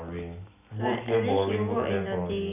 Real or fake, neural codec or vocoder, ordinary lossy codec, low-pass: real; none; none; 3.6 kHz